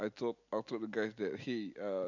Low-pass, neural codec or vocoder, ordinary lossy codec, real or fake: 7.2 kHz; none; none; real